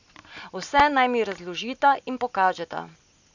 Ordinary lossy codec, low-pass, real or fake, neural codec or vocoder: none; 7.2 kHz; real; none